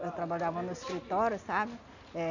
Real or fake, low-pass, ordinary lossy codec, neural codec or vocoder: real; 7.2 kHz; none; none